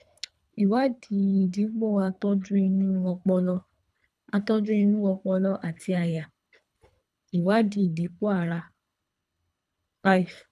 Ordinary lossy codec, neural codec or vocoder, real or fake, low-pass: none; codec, 24 kHz, 3 kbps, HILCodec; fake; none